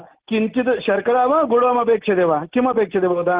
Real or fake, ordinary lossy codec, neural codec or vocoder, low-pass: real; Opus, 16 kbps; none; 3.6 kHz